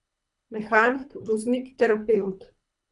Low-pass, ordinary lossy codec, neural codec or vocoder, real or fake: 10.8 kHz; none; codec, 24 kHz, 3 kbps, HILCodec; fake